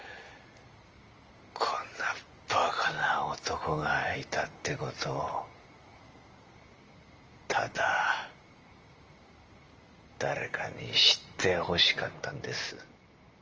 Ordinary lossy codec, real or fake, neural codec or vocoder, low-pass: Opus, 24 kbps; real; none; 7.2 kHz